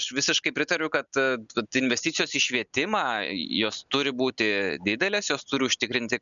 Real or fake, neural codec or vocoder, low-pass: real; none; 7.2 kHz